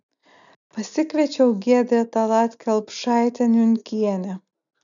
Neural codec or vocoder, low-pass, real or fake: none; 7.2 kHz; real